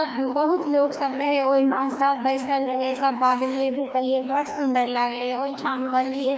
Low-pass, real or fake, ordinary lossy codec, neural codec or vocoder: none; fake; none; codec, 16 kHz, 1 kbps, FreqCodec, larger model